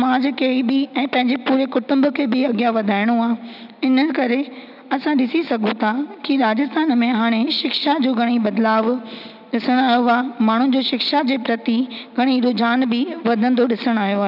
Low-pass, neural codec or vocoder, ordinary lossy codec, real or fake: 5.4 kHz; none; none; real